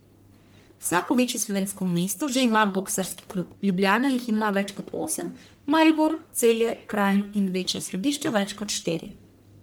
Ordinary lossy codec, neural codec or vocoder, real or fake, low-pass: none; codec, 44.1 kHz, 1.7 kbps, Pupu-Codec; fake; none